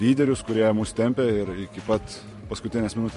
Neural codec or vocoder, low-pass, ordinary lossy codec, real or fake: vocoder, 44.1 kHz, 128 mel bands every 512 samples, BigVGAN v2; 14.4 kHz; MP3, 48 kbps; fake